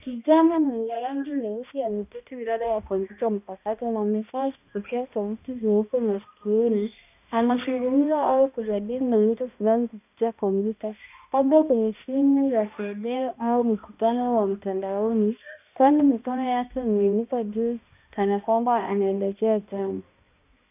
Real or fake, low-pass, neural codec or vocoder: fake; 3.6 kHz; codec, 16 kHz, 1 kbps, X-Codec, HuBERT features, trained on balanced general audio